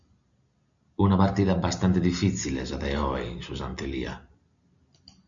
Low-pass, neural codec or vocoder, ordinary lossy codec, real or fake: 7.2 kHz; none; MP3, 96 kbps; real